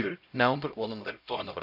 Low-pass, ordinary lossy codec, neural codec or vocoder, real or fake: 5.4 kHz; MP3, 48 kbps; codec, 16 kHz, 0.5 kbps, X-Codec, HuBERT features, trained on LibriSpeech; fake